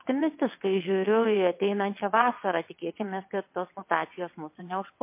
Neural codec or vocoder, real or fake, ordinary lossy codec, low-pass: vocoder, 22.05 kHz, 80 mel bands, WaveNeXt; fake; MP3, 24 kbps; 3.6 kHz